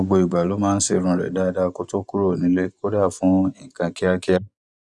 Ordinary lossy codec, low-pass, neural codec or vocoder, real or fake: none; none; vocoder, 24 kHz, 100 mel bands, Vocos; fake